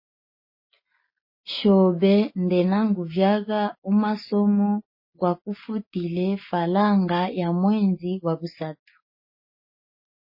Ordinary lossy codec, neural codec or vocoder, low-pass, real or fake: MP3, 24 kbps; none; 5.4 kHz; real